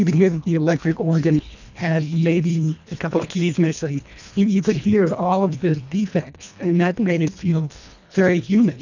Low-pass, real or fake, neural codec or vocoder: 7.2 kHz; fake; codec, 24 kHz, 1.5 kbps, HILCodec